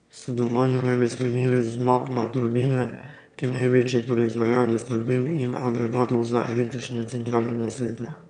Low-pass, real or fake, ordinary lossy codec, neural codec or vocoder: 9.9 kHz; fake; none; autoencoder, 22.05 kHz, a latent of 192 numbers a frame, VITS, trained on one speaker